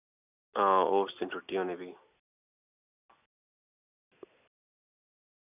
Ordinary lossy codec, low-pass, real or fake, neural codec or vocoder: none; 3.6 kHz; real; none